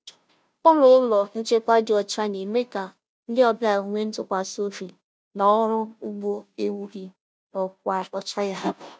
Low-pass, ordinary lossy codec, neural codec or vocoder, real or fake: none; none; codec, 16 kHz, 0.5 kbps, FunCodec, trained on Chinese and English, 25 frames a second; fake